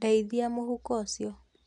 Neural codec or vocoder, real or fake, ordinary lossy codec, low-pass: none; real; none; 10.8 kHz